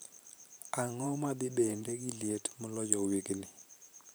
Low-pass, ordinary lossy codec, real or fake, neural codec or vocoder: none; none; fake; vocoder, 44.1 kHz, 128 mel bands every 512 samples, BigVGAN v2